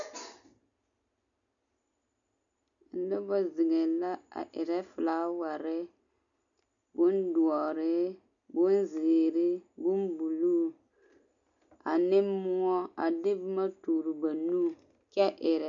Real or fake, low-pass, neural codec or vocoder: real; 7.2 kHz; none